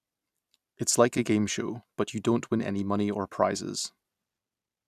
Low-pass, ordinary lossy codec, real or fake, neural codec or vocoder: 14.4 kHz; none; fake; vocoder, 44.1 kHz, 128 mel bands every 256 samples, BigVGAN v2